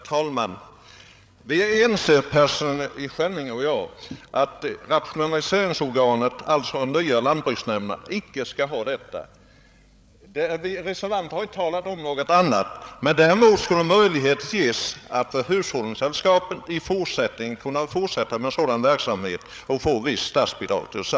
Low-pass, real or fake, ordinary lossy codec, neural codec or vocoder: none; fake; none; codec, 16 kHz, 8 kbps, FreqCodec, larger model